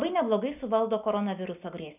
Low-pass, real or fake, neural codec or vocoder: 3.6 kHz; real; none